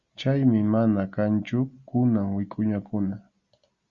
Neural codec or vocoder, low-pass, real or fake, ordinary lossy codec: none; 7.2 kHz; real; Opus, 64 kbps